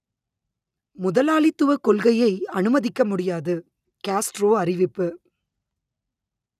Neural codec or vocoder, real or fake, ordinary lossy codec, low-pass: none; real; none; 14.4 kHz